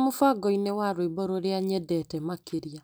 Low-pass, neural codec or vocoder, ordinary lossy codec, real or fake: none; none; none; real